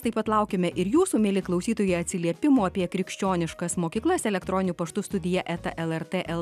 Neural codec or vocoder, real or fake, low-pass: none; real; 14.4 kHz